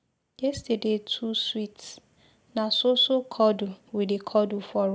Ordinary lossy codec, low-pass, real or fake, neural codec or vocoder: none; none; real; none